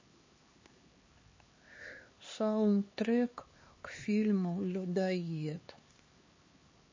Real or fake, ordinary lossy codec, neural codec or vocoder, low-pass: fake; MP3, 32 kbps; codec, 16 kHz, 2 kbps, X-Codec, HuBERT features, trained on LibriSpeech; 7.2 kHz